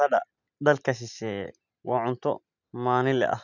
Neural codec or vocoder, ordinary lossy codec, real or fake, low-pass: none; none; real; 7.2 kHz